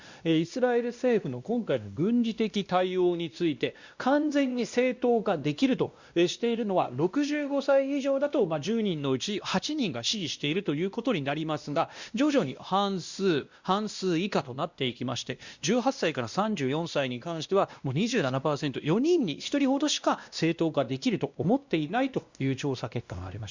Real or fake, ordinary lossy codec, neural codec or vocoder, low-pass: fake; Opus, 64 kbps; codec, 16 kHz, 1 kbps, X-Codec, WavLM features, trained on Multilingual LibriSpeech; 7.2 kHz